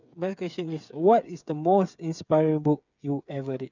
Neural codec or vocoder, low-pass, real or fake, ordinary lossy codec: codec, 16 kHz, 8 kbps, FreqCodec, smaller model; 7.2 kHz; fake; none